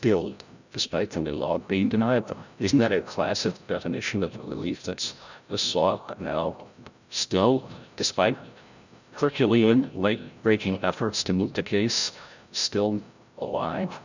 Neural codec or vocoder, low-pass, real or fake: codec, 16 kHz, 0.5 kbps, FreqCodec, larger model; 7.2 kHz; fake